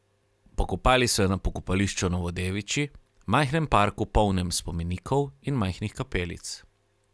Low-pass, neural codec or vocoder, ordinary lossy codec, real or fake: none; none; none; real